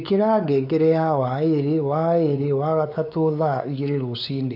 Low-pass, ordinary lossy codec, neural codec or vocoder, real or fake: 5.4 kHz; none; codec, 16 kHz, 4 kbps, X-Codec, WavLM features, trained on Multilingual LibriSpeech; fake